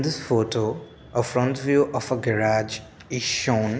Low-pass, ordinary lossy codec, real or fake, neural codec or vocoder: none; none; real; none